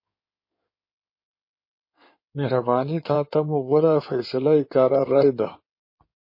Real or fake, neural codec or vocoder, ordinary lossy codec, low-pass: fake; codec, 16 kHz in and 24 kHz out, 2.2 kbps, FireRedTTS-2 codec; MP3, 24 kbps; 5.4 kHz